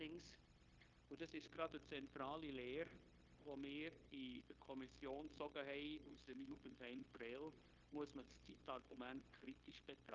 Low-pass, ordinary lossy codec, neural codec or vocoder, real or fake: 7.2 kHz; Opus, 32 kbps; codec, 16 kHz, 0.9 kbps, LongCat-Audio-Codec; fake